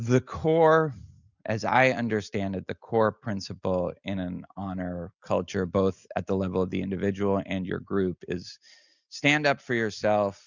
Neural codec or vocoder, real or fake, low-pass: none; real; 7.2 kHz